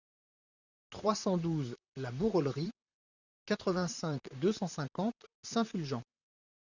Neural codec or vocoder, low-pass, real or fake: vocoder, 44.1 kHz, 128 mel bands, Pupu-Vocoder; 7.2 kHz; fake